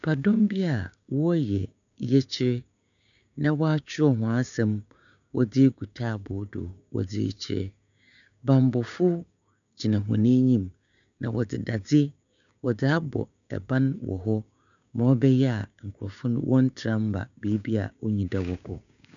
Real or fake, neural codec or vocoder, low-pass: fake; codec, 16 kHz, 6 kbps, DAC; 7.2 kHz